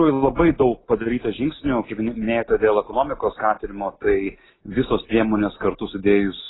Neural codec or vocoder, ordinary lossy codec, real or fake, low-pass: none; AAC, 16 kbps; real; 7.2 kHz